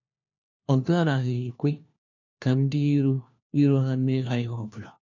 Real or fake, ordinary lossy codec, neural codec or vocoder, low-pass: fake; none; codec, 16 kHz, 1 kbps, FunCodec, trained on LibriTTS, 50 frames a second; 7.2 kHz